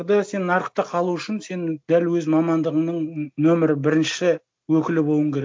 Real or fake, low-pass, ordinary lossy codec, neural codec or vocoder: real; none; none; none